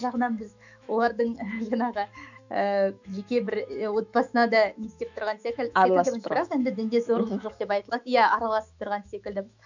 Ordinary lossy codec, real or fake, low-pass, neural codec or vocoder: none; fake; 7.2 kHz; autoencoder, 48 kHz, 128 numbers a frame, DAC-VAE, trained on Japanese speech